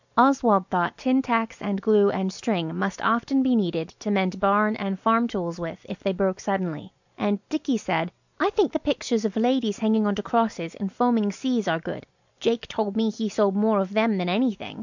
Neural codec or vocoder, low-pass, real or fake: none; 7.2 kHz; real